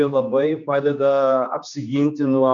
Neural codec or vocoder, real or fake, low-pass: codec, 16 kHz, 2 kbps, X-Codec, HuBERT features, trained on general audio; fake; 7.2 kHz